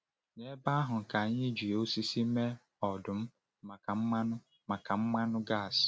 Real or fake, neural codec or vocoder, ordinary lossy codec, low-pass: real; none; none; none